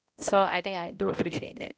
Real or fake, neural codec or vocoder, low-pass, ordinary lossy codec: fake; codec, 16 kHz, 0.5 kbps, X-Codec, HuBERT features, trained on balanced general audio; none; none